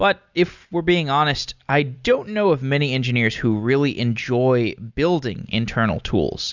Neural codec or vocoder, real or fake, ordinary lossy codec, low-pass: none; real; Opus, 64 kbps; 7.2 kHz